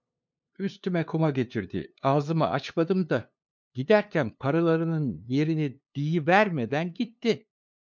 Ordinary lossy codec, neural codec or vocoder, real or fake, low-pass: MP3, 64 kbps; codec, 16 kHz, 2 kbps, FunCodec, trained on LibriTTS, 25 frames a second; fake; 7.2 kHz